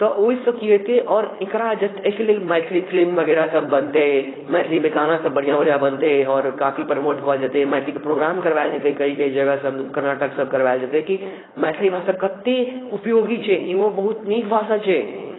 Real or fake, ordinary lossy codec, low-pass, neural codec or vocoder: fake; AAC, 16 kbps; 7.2 kHz; codec, 16 kHz, 4.8 kbps, FACodec